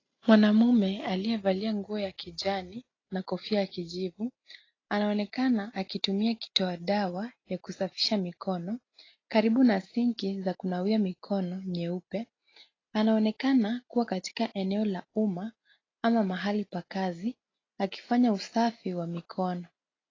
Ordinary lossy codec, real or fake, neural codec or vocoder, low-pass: AAC, 32 kbps; real; none; 7.2 kHz